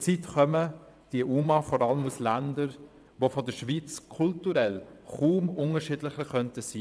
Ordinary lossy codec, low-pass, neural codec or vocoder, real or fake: none; none; vocoder, 22.05 kHz, 80 mel bands, WaveNeXt; fake